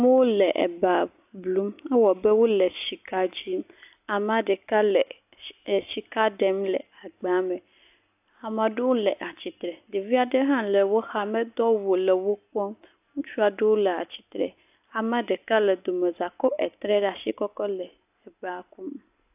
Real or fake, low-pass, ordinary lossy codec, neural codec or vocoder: real; 3.6 kHz; AAC, 32 kbps; none